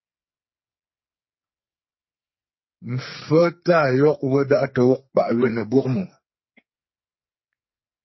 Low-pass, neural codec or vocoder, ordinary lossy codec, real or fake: 7.2 kHz; codec, 44.1 kHz, 2.6 kbps, SNAC; MP3, 24 kbps; fake